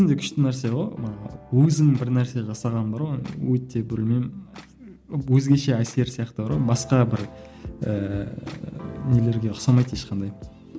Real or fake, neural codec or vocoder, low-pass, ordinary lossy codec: real; none; none; none